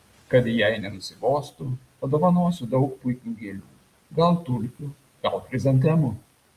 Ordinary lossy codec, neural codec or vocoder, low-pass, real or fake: Opus, 32 kbps; none; 14.4 kHz; real